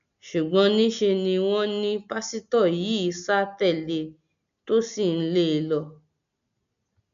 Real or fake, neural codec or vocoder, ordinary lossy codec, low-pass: real; none; none; 7.2 kHz